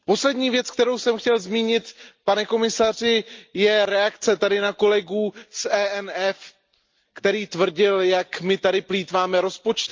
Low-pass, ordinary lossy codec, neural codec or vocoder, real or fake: 7.2 kHz; Opus, 24 kbps; none; real